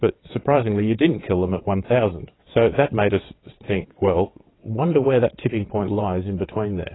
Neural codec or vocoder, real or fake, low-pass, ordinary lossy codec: vocoder, 22.05 kHz, 80 mel bands, WaveNeXt; fake; 7.2 kHz; AAC, 16 kbps